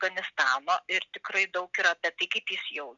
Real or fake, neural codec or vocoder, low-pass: real; none; 7.2 kHz